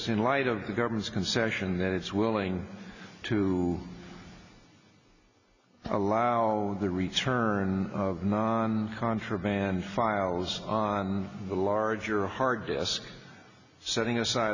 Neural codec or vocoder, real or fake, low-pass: none; real; 7.2 kHz